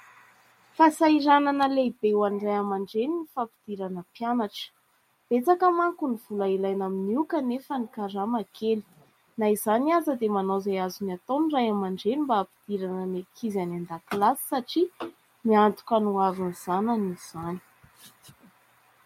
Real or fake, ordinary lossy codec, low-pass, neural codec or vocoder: real; MP3, 64 kbps; 19.8 kHz; none